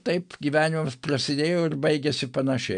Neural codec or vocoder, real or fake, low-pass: none; real; 9.9 kHz